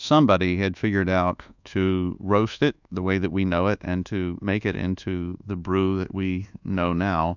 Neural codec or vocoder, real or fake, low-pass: codec, 24 kHz, 1.2 kbps, DualCodec; fake; 7.2 kHz